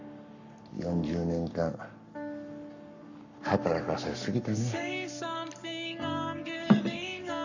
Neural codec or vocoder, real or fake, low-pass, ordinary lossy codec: codec, 44.1 kHz, 7.8 kbps, Pupu-Codec; fake; 7.2 kHz; none